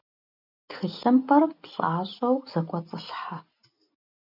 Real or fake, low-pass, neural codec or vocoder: real; 5.4 kHz; none